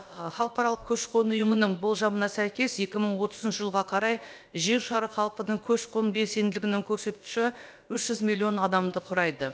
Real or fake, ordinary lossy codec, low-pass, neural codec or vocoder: fake; none; none; codec, 16 kHz, about 1 kbps, DyCAST, with the encoder's durations